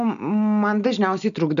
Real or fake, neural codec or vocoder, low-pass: real; none; 7.2 kHz